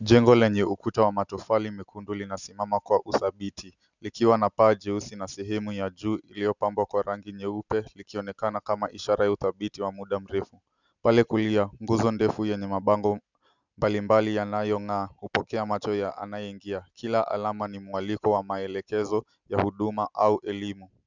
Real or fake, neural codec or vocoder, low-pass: real; none; 7.2 kHz